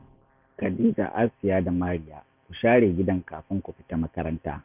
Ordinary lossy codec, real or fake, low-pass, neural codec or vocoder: none; fake; 3.6 kHz; vocoder, 44.1 kHz, 128 mel bands every 512 samples, BigVGAN v2